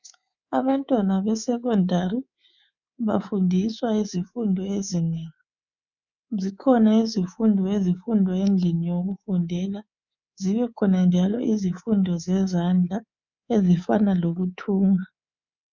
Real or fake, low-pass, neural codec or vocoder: fake; 7.2 kHz; codec, 24 kHz, 3.1 kbps, DualCodec